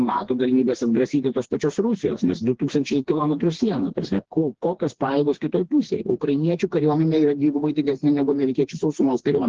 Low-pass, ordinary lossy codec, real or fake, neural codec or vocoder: 7.2 kHz; Opus, 16 kbps; fake; codec, 16 kHz, 2 kbps, FreqCodec, smaller model